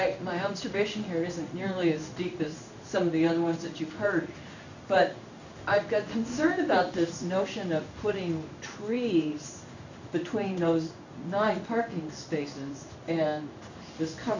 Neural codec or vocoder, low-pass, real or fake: codec, 16 kHz in and 24 kHz out, 1 kbps, XY-Tokenizer; 7.2 kHz; fake